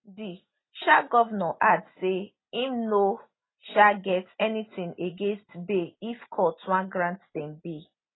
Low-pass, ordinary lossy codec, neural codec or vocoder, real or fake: 7.2 kHz; AAC, 16 kbps; none; real